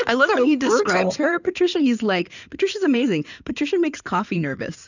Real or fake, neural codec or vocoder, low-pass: fake; codec, 16 kHz in and 24 kHz out, 2.2 kbps, FireRedTTS-2 codec; 7.2 kHz